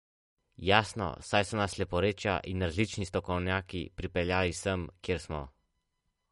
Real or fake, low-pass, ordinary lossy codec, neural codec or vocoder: real; 19.8 kHz; MP3, 48 kbps; none